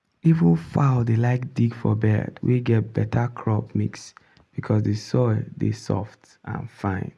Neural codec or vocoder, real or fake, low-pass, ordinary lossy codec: none; real; none; none